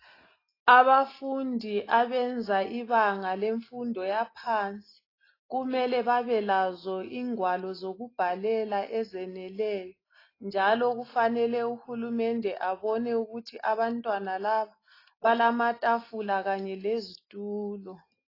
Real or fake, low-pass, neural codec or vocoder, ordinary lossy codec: real; 5.4 kHz; none; AAC, 24 kbps